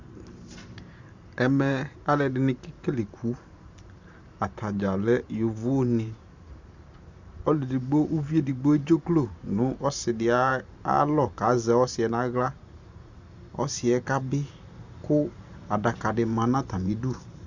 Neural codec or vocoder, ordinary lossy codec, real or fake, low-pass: vocoder, 44.1 kHz, 128 mel bands every 512 samples, BigVGAN v2; Opus, 64 kbps; fake; 7.2 kHz